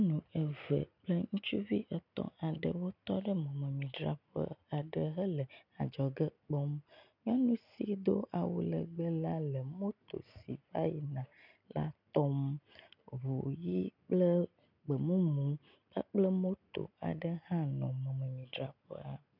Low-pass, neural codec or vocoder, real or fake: 5.4 kHz; none; real